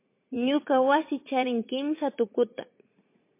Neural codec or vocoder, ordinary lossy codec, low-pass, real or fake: vocoder, 44.1 kHz, 80 mel bands, Vocos; MP3, 24 kbps; 3.6 kHz; fake